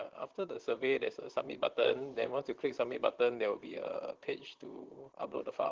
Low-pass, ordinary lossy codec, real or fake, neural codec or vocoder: 7.2 kHz; Opus, 16 kbps; fake; vocoder, 44.1 kHz, 128 mel bands, Pupu-Vocoder